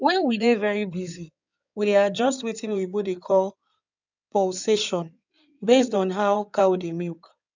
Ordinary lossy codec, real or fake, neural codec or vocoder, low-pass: none; fake; codec, 16 kHz in and 24 kHz out, 2.2 kbps, FireRedTTS-2 codec; 7.2 kHz